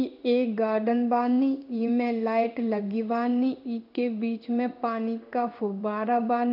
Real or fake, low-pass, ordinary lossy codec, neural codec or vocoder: fake; 5.4 kHz; none; codec, 16 kHz in and 24 kHz out, 1 kbps, XY-Tokenizer